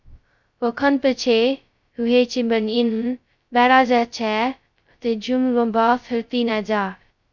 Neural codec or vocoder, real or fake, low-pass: codec, 16 kHz, 0.2 kbps, FocalCodec; fake; 7.2 kHz